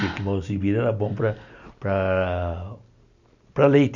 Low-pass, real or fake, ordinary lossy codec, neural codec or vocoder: 7.2 kHz; real; none; none